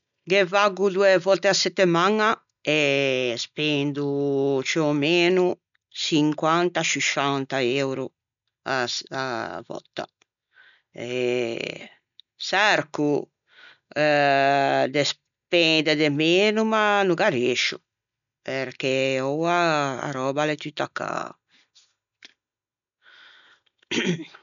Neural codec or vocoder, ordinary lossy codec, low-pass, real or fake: none; none; 7.2 kHz; real